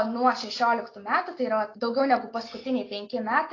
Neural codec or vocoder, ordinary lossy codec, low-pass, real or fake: none; AAC, 32 kbps; 7.2 kHz; real